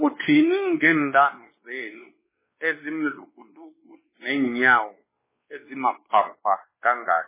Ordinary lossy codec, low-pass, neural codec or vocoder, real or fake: MP3, 16 kbps; 3.6 kHz; codec, 16 kHz, 2 kbps, X-Codec, WavLM features, trained on Multilingual LibriSpeech; fake